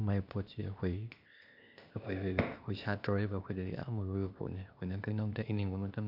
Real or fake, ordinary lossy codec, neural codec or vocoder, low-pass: fake; none; codec, 16 kHz, 0.8 kbps, ZipCodec; 5.4 kHz